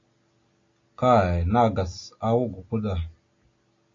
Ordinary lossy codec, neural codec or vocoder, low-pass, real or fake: AAC, 32 kbps; none; 7.2 kHz; real